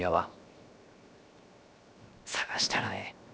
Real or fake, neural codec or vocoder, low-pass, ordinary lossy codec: fake; codec, 16 kHz, 0.7 kbps, FocalCodec; none; none